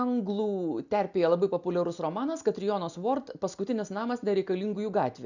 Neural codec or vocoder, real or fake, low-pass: none; real; 7.2 kHz